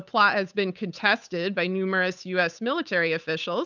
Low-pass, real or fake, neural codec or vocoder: 7.2 kHz; fake; codec, 16 kHz, 8 kbps, FunCodec, trained on Chinese and English, 25 frames a second